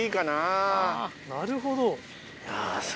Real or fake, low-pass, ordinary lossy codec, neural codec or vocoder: real; none; none; none